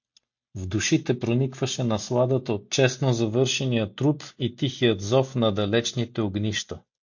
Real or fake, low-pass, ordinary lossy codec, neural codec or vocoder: real; 7.2 kHz; MP3, 48 kbps; none